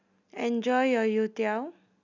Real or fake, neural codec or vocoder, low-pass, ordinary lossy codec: real; none; 7.2 kHz; none